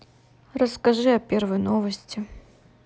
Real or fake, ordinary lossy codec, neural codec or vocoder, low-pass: real; none; none; none